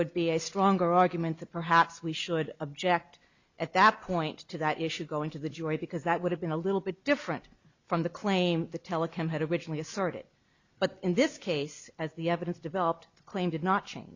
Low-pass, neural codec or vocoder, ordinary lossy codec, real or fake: 7.2 kHz; none; Opus, 64 kbps; real